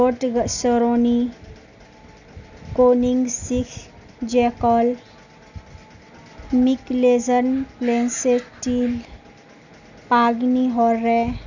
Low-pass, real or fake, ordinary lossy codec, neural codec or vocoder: 7.2 kHz; real; none; none